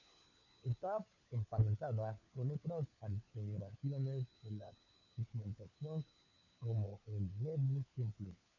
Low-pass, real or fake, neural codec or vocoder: 7.2 kHz; fake; codec, 16 kHz, 4 kbps, FunCodec, trained on LibriTTS, 50 frames a second